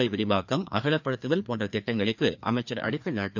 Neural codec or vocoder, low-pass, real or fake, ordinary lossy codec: codec, 16 kHz, 2 kbps, FreqCodec, larger model; 7.2 kHz; fake; none